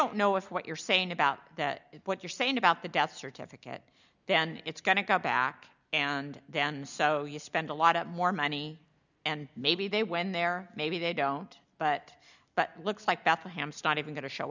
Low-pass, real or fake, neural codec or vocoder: 7.2 kHz; real; none